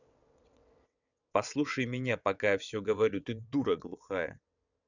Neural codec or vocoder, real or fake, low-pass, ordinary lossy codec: vocoder, 22.05 kHz, 80 mel bands, WaveNeXt; fake; 7.2 kHz; none